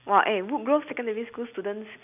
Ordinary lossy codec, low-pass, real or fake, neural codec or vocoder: none; 3.6 kHz; real; none